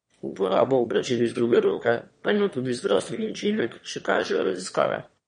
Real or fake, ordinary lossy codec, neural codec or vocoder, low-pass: fake; MP3, 48 kbps; autoencoder, 22.05 kHz, a latent of 192 numbers a frame, VITS, trained on one speaker; 9.9 kHz